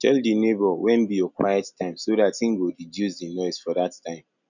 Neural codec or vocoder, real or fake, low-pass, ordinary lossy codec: none; real; 7.2 kHz; none